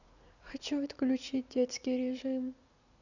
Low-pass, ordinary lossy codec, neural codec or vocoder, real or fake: 7.2 kHz; none; vocoder, 44.1 kHz, 80 mel bands, Vocos; fake